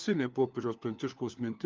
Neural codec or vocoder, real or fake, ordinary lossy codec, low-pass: codec, 16 kHz, 4 kbps, FunCodec, trained on LibriTTS, 50 frames a second; fake; Opus, 32 kbps; 7.2 kHz